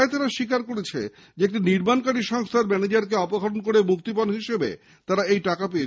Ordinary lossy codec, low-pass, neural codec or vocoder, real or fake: none; none; none; real